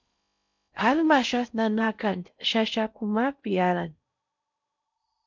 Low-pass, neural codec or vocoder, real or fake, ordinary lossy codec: 7.2 kHz; codec, 16 kHz in and 24 kHz out, 0.6 kbps, FocalCodec, streaming, 4096 codes; fake; MP3, 64 kbps